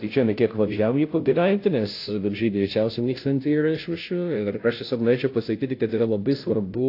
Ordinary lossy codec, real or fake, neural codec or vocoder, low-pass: AAC, 32 kbps; fake; codec, 16 kHz, 0.5 kbps, FunCodec, trained on Chinese and English, 25 frames a second; 5.4 kHz